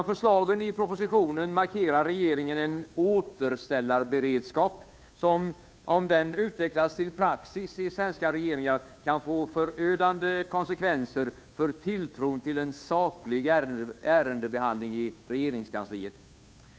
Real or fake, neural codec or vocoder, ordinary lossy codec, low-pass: fake; codec, 16 kHz, 2 kbps, FunCodec, trained on Chinese and English, 25 frames a second; none; none